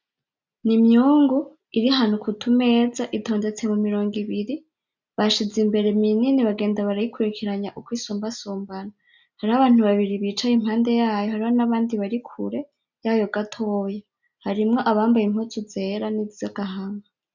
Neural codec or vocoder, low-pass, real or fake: none; 7.2 kHz; real